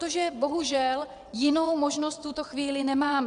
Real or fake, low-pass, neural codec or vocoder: fake; 9.9 kHz; vocoder, 22.05 kHz, 80 mel bands, WaveNeXt